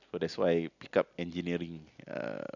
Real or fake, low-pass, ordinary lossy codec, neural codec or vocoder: real; 7.2 kHz; none; none